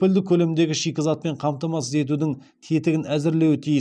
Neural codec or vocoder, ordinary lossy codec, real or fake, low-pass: none; none; real; none